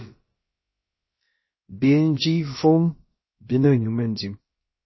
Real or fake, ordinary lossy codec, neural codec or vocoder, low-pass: fake; MP3, 24 kbps; codec, 16 kHz, about 1 kbps, DyCAST, with the encoder's durations; 7.2 kHz